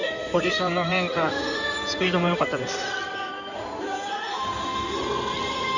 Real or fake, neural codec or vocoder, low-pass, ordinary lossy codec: fake; codec, 16 kHz in and 24 kHz out, 2.2 kbps, FireRedTTS-2 codec; 7.2 kHz; none